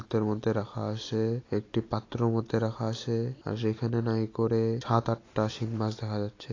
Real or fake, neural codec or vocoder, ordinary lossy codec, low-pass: real; none; AAC, 32 kbps; 7.2 kHz